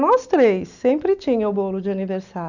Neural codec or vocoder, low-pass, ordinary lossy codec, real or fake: none; 7.2 kHz; none; real